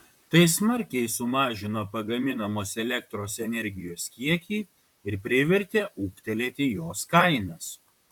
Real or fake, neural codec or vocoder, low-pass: fake; vocoder, 44.1 kHz, 128 mel bands, Pupu-Vocoder; 19.8 kHz